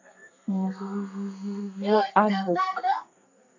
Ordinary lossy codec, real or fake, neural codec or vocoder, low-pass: AAC, 48 kbps; fake; codec, 32 kHz, 1.9 kbps, SNAC; 7.2 kHz